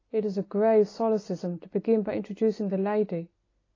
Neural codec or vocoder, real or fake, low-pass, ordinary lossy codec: none; real; 7.2 kHz; AAC, 32 kbps